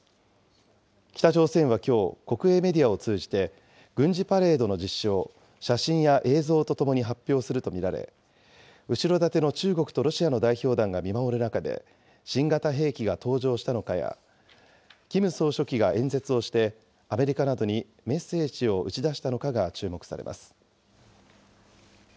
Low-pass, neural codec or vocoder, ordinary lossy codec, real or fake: none; none; none; real